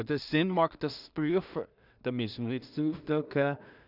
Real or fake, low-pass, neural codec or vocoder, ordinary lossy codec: fake; 5.4 kHz; codec, 16 kHz in and 24 kHz out, 0.4 kbps, LongCat-Audio-Codec, two codebook decoder; none